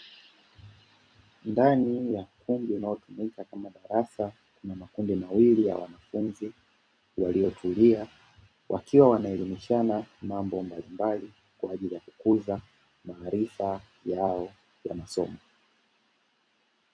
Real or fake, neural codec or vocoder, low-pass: fake; vocoder, 44.1 kHz, 128 mel bands every 256 samples, BigVGAN v2; 9.9 kHz